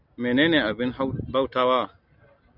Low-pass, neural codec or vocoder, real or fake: 5.4 kHz; none; real